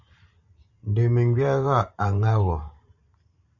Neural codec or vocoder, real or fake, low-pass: vocoder, 44.1 kHz, 128 mel bands every 512 samples, BigVGAN v2; fake; 7.2 kHz